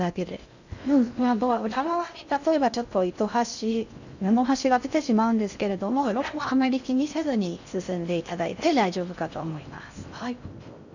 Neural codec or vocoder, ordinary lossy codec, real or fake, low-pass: codec, 16 kHz in and 24 kHz out, 0.6 kbps, FocalCodec, streaming, 2048 codes; none; fake; 7.2 kHz